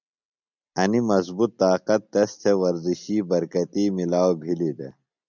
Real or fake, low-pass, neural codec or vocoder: real; 7.2 kHz; none